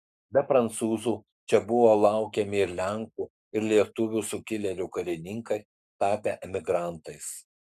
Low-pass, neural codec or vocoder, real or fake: 14.4 kHz; codec, 44.1 kHz, 7.8 kbps, Pupu-Codec; fake